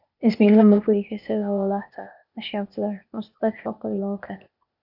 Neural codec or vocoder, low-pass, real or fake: codec, 16 kHz, 0.8 kbps, ZipCodec; 5.4 kHz; fake